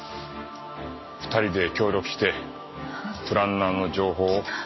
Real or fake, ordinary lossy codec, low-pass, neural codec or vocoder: real; MP3, 24 kbps; 7.2 kHz; none